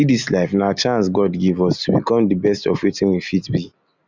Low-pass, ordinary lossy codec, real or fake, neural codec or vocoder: 7.2 kHz; Opus, 64 kbps; real; none